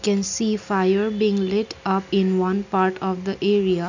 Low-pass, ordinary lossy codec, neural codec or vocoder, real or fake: 7.2 kHz; none; none; real